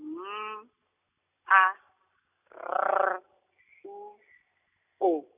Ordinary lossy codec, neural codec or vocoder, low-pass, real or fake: none; none; 3.6 kHz; real